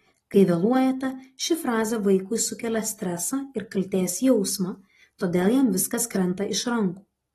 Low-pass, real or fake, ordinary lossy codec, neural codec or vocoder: 14.4 kHz; real; AAC, 32 kbps; none